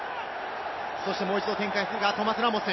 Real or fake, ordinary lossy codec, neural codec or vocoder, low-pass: real; MP3, 24 kbps; none; 7.2 kHz